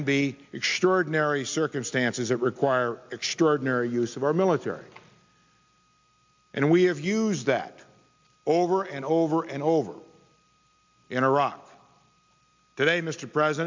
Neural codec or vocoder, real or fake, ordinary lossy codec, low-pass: none; real; MP3, 64 kbps; 7.2 kHz